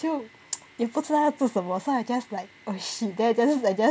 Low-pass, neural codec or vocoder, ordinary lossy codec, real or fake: none; none; none; real